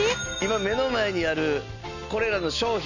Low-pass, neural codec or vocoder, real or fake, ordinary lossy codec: 7.2 kHz; none; real; none